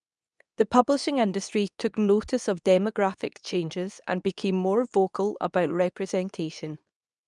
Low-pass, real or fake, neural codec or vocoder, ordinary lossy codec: 10.8 kHz; fake; codec, 24 kHz, 0.9 kbps, WavTokenizer, medium speech release version 2; none